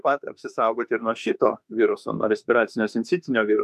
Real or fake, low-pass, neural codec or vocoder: fake; 14.4 kHz; autoencoder, 48 kHz, 32 numbers a frame, DAC-VAE, trained on Japanese speech